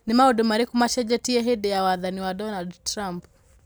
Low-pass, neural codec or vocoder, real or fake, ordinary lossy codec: none; none; real; none